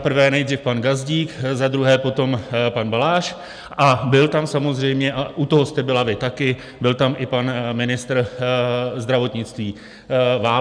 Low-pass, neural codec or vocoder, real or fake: 9.9 kHz; none; real